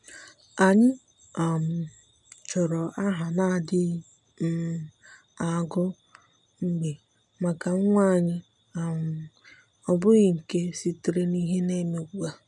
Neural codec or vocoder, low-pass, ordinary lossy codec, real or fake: none; 10.8 kHz; none; real